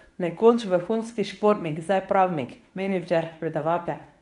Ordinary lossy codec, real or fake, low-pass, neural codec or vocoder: none; fake; 10.8 kHz; codec, 24 kHz, 0.9 kbps, WavTokenizer, medium speech release version 1